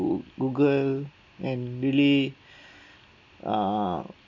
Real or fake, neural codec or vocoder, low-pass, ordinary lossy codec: real; none; 7.2 kHz; none